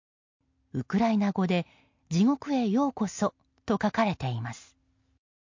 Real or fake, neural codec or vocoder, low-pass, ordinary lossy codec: real; none; 7.2 kHz; MP3, 48 kbps